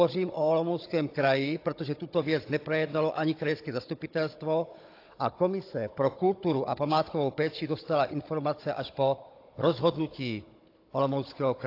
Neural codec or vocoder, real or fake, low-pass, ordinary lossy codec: codec, 16 kHz, 16 kbps, FunCodec, trained on Chinese and English, 50 frames a second; fake; 5.4 kHz; AAC, 32 kbps